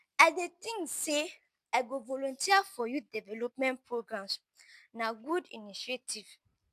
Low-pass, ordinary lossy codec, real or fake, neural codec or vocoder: 14.4 kHz; none; real; none